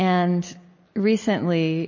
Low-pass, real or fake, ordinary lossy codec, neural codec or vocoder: 7.2 kHz; real; MP3, 32 kbps; none